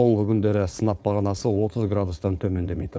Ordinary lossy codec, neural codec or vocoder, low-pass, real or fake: none; codec, 16 kHz, 4.8 kbps, FACodec; none; fake